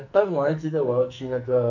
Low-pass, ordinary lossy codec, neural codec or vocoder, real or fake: 7.2 kHz; none; codec, 44.1 kHz, 2.6 kbps, SNAC; fake